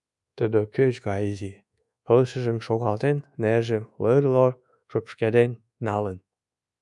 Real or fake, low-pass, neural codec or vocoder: fake; 10.8 kHz; codec, 24 kHz, 1.2 kbps, DualCodec